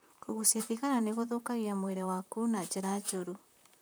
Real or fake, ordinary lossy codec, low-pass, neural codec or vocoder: fake; none; none; vocoder, 44.1 kHz, 128 mel bands, Pupu-Vocoder